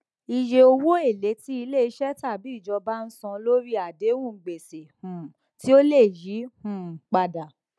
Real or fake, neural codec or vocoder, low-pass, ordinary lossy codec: real; none; none; none